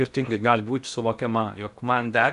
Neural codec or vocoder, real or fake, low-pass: codec, 16 kHz in and 24 kHz out, 0.8 kbps, FocalCodec, streaming, 65536 codes; fake; 10.8 kHz